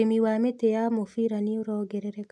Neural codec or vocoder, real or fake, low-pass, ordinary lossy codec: none; real; none; none